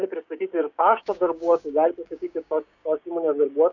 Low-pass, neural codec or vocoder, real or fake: 7.2 kHz; codec, 16 kHz, 6 kbps, DAC; fake